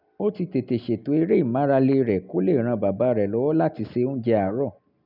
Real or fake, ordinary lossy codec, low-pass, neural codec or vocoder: real; none; 5.4 kHz; none